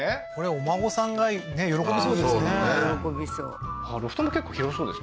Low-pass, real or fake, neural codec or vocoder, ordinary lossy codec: none; real; none; none